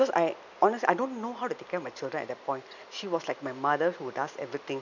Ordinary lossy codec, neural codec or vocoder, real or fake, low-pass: none; none; real; 7.2 kHz